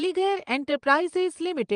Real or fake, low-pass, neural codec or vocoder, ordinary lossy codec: fake; 9.9 kHz; vocoder, 22.05 kHz, 80 mel bands, Vocos; Opus, 32 kbps